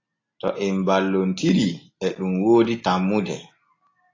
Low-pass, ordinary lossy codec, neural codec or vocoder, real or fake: 7.2 kHz; AAC, 32 kbps; none; real